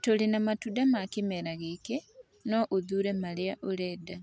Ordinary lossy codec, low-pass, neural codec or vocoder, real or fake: none; none; none; real